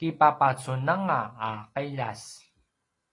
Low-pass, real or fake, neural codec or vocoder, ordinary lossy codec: 10.8 kHz; real; none; AAC, 32 kbps